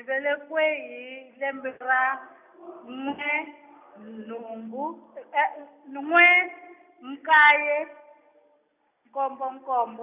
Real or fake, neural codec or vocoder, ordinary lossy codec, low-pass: real; none; none; 3.6 kHz